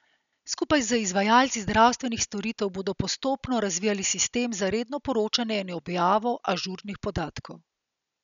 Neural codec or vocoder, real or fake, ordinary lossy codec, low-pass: none; real; none; 7.2 kHz